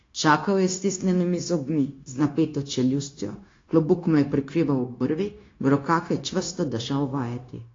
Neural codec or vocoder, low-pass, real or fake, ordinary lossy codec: codec, 16 kHz, 0.9 kbps, LongCat-Audio-Codec; 7.2 kHz; fake; AAC, 32 kbps